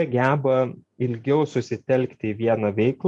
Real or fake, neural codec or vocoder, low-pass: real; none; 10.8 kHz